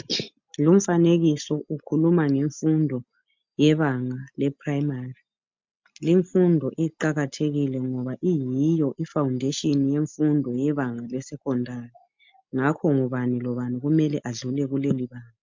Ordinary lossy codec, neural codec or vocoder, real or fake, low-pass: MP3, 64 kbps; none; real; 7.2 kHz